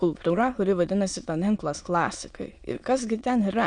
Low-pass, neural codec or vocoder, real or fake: 9.9 kHz; autoencoder, 22.05 kHz, a latent of 192 numbers a frame, VITS, trained on many speakers; fake